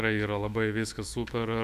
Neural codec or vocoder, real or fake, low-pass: autoencoder, 48 kHz, 128 numbers a frame, DAC-VAE, trained on Japanese speech; fake; 14.4 kHz